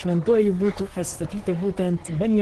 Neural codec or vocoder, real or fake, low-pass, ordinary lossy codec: codec, 24 kHz, 1 kbps, SNAC; fake; 10.8 kHz; Opus, 16 kbps